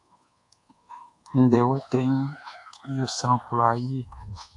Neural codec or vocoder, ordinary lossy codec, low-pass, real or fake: codec, 24 kHz, 1.2 kbps, DualCodec; AAC, 64 kbps; 10.8 kHz; fake